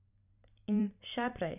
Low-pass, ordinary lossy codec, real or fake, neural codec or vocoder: 3.6 kHz; none; fake; vocoder, 44.1 kHz, 128 mel bands every 512 samples, BigVGAN v2